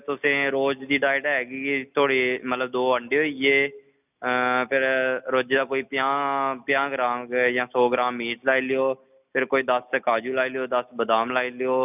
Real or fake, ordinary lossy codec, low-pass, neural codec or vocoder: real; none; 3.6 kHz; none